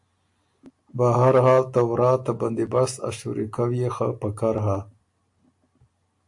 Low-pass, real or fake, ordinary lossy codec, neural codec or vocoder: 10.8 kHz; real; AAC, 64 kbps; none